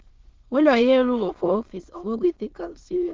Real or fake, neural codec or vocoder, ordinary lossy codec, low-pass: fake; autoencoder, 22.05 kHz, a latent of 192 numbers a frame, VITS, trained on many speakers; Opus, 16 kbps; 7.2 kHz